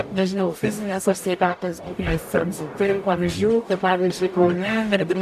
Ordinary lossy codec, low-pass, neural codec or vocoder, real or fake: AAC, 96 kbps; 14.4 kHz; codec, 44.1 kHz, 0.9 kbps, DAC; fake